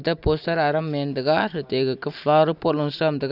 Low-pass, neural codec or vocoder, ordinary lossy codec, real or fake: 5.4 kHz; none; none; real